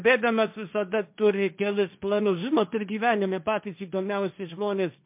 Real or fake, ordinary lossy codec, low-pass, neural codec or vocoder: fake; MP3, 32 kbps; 3.6 kHz; codec, 16 kHz, 1.1 kbps, Voila-Tokenizer